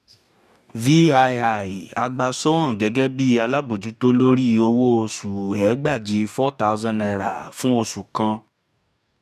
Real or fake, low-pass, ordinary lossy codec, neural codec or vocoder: fake; 14.4 kHz; none; codec, 44.1 kHz, 2.6 kbps, DAC